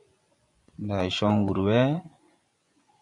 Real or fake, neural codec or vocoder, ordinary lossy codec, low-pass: fake; vocoder, 24 kHz, 100 mel bands, Vocos; AAC, 64 kbps; 10.8 kHz